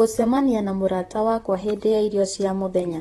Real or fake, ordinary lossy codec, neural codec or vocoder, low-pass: fake; AAC, 32 kbps; vocoder, 44.1 kHz, 128 mel bands, Pupu-Vocoder; 19.8 kHz